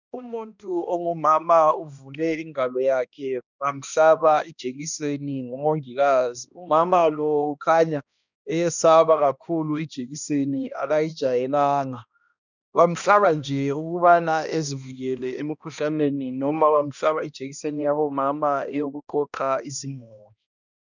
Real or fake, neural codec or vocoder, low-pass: fake; codec, 16 kHz, 1 kbps, X-Codec, HuBERT features, trained on balanced general audio; 7.2 kHz